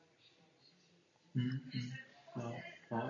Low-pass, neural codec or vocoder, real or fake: 7.2 kHz; none; real